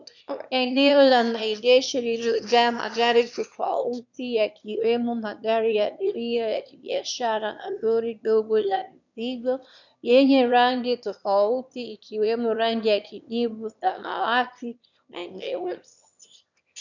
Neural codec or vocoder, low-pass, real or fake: autoencoder, 22.05 kHz, a latent of 192 numbers a frame, VITS, trained on one speaker; 7.2 kHz; fake